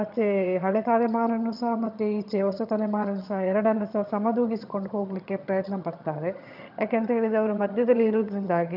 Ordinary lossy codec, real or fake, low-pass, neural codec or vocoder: none; fake; 5.4 kHz; vocoder, 22.05 kHz, 80 mel bands, HiFi-GAN